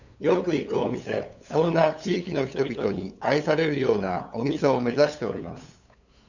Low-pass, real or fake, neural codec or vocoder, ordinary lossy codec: 7.2 kHz; fake; codec, 16 kHz, 8 kbps, FunCodec, trained on LibriTTS, 25 frames a second; none